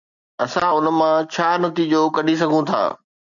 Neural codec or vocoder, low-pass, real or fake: none; 7.2 kHz; real